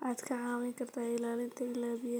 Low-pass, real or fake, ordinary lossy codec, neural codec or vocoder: none; real; none; none